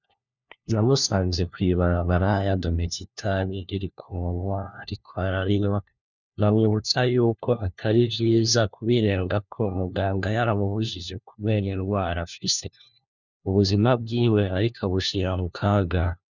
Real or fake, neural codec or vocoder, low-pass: fake; codec, 16 kHz, 1 kbps, FunCodec, trained on LibriTTS, 50 frames a second; 7.2 kHz